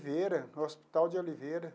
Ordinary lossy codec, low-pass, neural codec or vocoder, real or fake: none; none; none; real